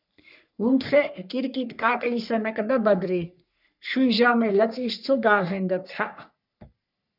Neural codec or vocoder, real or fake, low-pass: codec, 44.1 kHz, 3.4 kbps, Pupu-Codec; fake; 5.4 kHz